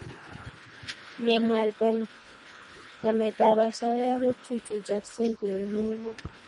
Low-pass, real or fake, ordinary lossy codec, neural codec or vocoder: 10.8 kHz; fake; MP3, 48 kbps; codec, 24 kHz, 1.5 kbps, HILCodec